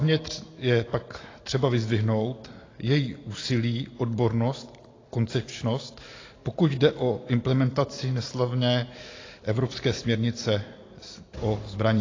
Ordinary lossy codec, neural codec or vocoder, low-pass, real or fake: AAC, 32 kbps; none; 7.2 kHz; real